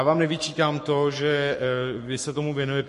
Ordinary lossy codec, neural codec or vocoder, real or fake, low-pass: MP3, 48 kbps; codec, 44.1 kHz, 7.8 kbps, Pupu-Codec; fake; 14.4 kHz